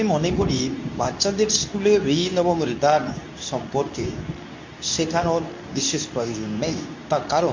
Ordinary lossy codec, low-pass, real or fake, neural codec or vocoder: MP3, 48 kbps; 7.2 kHz; fake; codec, 24 kHz, 0.9 kbps, WavTokenizer, medium speech release version 2